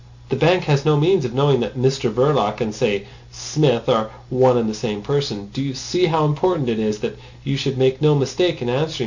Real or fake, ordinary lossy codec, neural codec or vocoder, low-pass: real; Opus, 64 kbps; none; 7.2 kHz